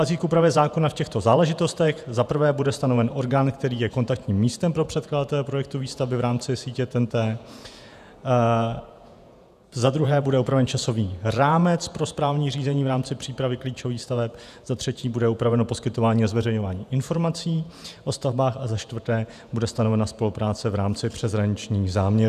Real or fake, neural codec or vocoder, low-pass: fake; vocoder, 48 kHz, 128 mel bands, Vocos; 14.4 kHz